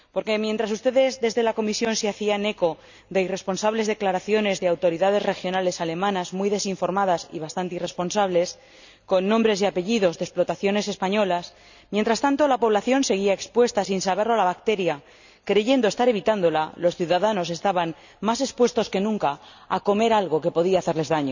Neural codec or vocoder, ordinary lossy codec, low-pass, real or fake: none; none; 7.2 kHz; real